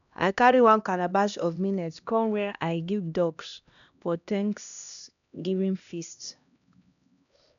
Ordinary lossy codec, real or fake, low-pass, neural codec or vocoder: none; fake; 7.2 kHz; codec, 16 kHz, 1 kbps, X-Codec, HuBERT features, trained on LibriSpeech